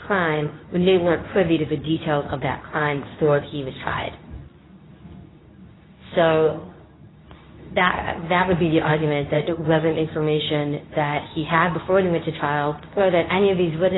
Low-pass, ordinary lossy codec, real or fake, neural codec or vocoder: 7.2 kHz; AAC, 16 kbps; fake; codec, 24 kHz, 0.9 kbps, WavTokenizer, medium speech release version 2